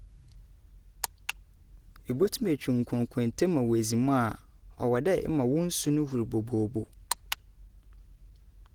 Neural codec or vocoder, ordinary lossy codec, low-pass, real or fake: none; Opus, 16 kbps; 19.8 kHz; real